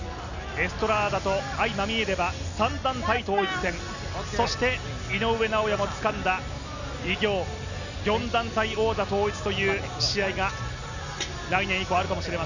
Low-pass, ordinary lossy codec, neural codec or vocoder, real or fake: 7.2 kHz; none; none; real